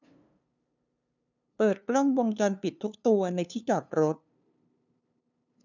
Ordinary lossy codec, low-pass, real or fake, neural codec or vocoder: none; 7.2 kHz; fake; codec, 16 kHz, 2 kbps, FunCodec, trained on LibriTTS, 25 frames a second